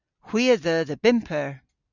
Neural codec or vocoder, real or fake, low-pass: none; real; 7.2 kHz